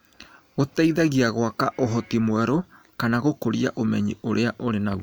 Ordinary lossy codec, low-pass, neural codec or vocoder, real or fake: none; none; none; real